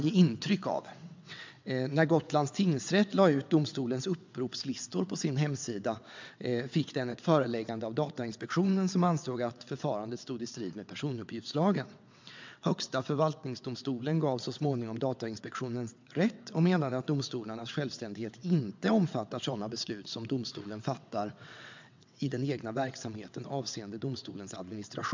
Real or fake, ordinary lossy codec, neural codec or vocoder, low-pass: fake; MP3, 64 kbps; vocoder, 22.05 kHz, 80 mel bands, WaveNeXt; 7.2 kHz